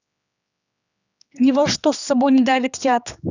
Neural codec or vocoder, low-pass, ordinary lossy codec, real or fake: codec, 16 kHz, 2 kbps, X-Codec, HuBERT features, trained on general audio; 7.2 kHz; none; fake